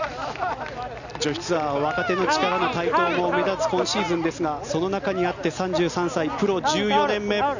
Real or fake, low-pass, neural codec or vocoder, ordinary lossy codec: real; 7.2 kHz; none; none